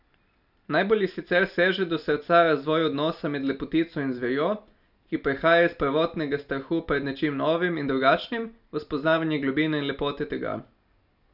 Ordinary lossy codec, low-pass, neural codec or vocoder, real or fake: none; 5.4 kHz; none; real